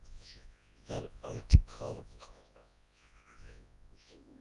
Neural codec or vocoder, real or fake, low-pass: codec, 24 kHz, 0.9 kbps, WavTokenizer, large speech release; fake; 10.8 kHz